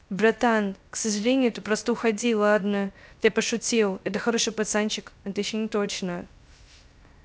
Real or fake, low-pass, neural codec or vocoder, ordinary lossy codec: fake; none; codec, 16 kHz, 0.3 kbps, FocalCodec; none